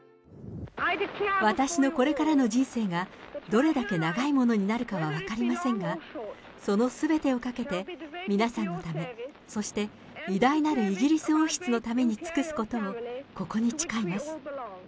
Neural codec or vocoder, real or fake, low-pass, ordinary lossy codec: none; real; none; none